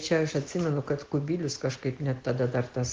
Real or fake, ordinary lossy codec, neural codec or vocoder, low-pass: real; Opus, 16 kbps; none; 7.2 kHz